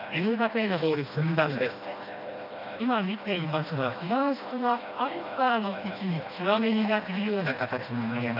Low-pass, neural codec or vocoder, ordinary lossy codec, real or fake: 5.4 kHz; codec, 16 kHz, 1 kbps, FreqCodec, smaller model; none; fake